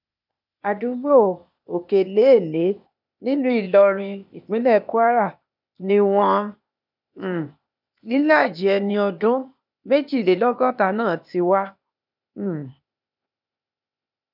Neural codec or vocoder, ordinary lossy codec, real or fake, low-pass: codec, 16 kHz, 0.8 kbps, ZipCodec; none; fake; 5.4 kHz